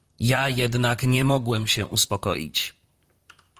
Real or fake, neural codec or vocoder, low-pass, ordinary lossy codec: fake; vocoder, 44.1 kHz, 128 mel bands, Pupu-Vocoder; 14.4 kHz; Opus, 24 kbps